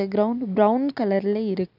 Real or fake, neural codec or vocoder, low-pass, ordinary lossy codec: real; none; 5.4 kHz; none